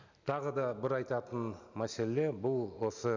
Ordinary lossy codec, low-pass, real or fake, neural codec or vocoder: none; 7.2 kHz; real; none